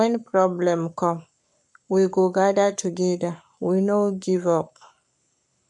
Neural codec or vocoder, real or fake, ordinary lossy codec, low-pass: codec, 44.1 kHz, 7.8 kbps, Pupu-Codec; fake; none; 10.8 kHz